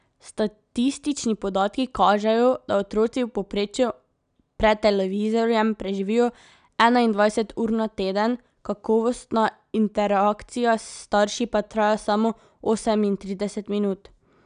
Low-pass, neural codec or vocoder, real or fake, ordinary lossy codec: 9.9 kHz; none; real; none